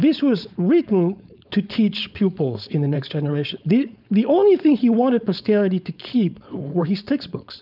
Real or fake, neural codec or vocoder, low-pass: fake; codec, 16 kHz, 4.8 kbps, FACodec; 5.4 kHz